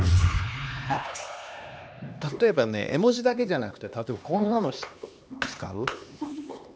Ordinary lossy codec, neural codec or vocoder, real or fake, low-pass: none; codec, 16 kHz, 2 kbps, X-Codec, HuBERT features, trained on LibriSpeech; fake; none